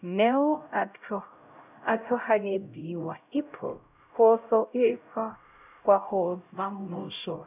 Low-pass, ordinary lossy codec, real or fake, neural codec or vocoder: 3.6 kHz; none; fake; codec, 16 kHz, 0.5 kbps, X-Codec, HuBERT features, trained on LibriSpeech